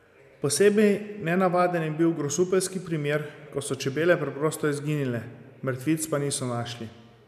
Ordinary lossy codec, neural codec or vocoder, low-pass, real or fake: none; none; 14.4 kHz; real